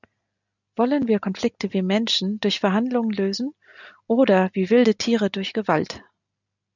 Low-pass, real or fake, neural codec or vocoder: 7.2 kHz; real; none